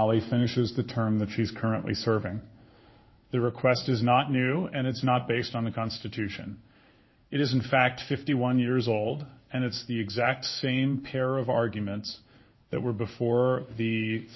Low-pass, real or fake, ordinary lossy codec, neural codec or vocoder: 7.2 kHz; fake; MP3, 24 kbps; codec, 16 kHz in and 24 kHz out, 1 kbps, XY-Tokenizer